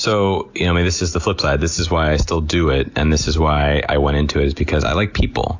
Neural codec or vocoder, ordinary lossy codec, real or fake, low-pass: none; AAC, 48 kbps; real; 7.2 kHz